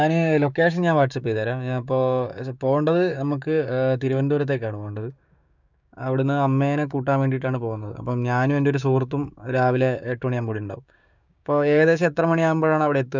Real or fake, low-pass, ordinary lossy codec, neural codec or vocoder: fake; 7.2 kHz; none; codec, 16 kHz, 6 kbps, DAC